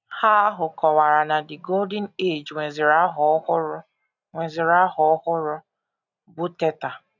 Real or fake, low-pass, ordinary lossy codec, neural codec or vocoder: real; 7.2 kHz; none; none